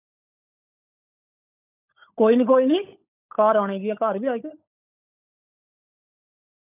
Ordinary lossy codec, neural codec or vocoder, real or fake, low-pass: none; codec, 16 kHz, 16 kbps, FunCodec, trained on LibriTTS, 50 frames a second; fake; 3.6 kHz